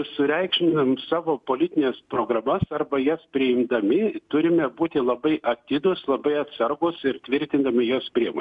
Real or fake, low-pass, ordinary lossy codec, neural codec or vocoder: real; 10.8 kHz; MP3, 96 kbps; none